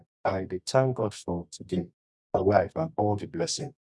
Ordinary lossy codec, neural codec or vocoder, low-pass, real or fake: none; codec, 24 kHz, 0.9 kbps, WavTokenizer, medium music audio release; none; fake